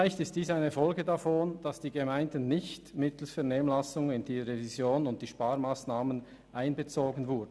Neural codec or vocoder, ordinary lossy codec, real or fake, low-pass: none; none; real; none